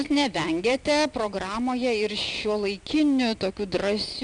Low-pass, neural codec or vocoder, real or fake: 9.9 kHz; none; real